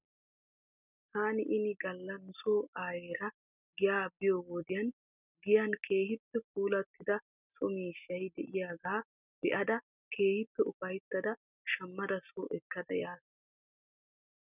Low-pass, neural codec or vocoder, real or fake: 3.6 kHz; none; real